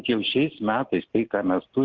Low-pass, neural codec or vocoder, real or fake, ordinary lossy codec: 7.2 kHz; none; real; Opus, 16 kbps